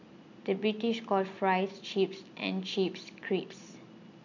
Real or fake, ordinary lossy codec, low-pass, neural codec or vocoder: real; none; 7.2 kHz; none